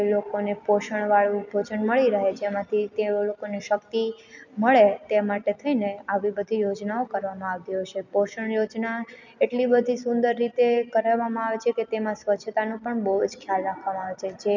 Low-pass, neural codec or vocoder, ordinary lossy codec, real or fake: 7.2 kHz; none; none; real